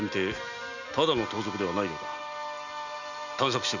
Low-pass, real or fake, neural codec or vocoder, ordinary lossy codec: 7.2 kHz; real; none; none